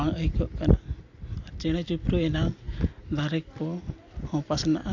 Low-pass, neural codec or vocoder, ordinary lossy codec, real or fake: 7.2 kHz; none; AAC, 48 kbps; real